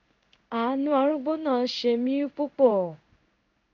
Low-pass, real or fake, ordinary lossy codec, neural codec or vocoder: 7.2 kHz; fake; none; codec, 16 kHz in and 24 kHz out, 1 kbps, XY-Tokenizer